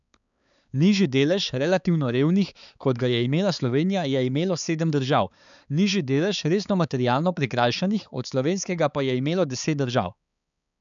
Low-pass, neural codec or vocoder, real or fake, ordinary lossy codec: 7.2 kHz; codec, 16 kHz, 4 kbps, X-Codec, HuBERT features, trained on balanced general audio; fake; none